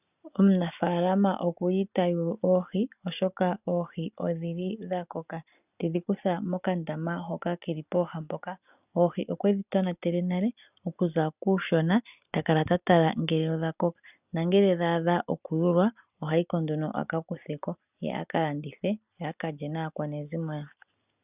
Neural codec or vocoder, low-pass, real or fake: none; 3.6 kHz; real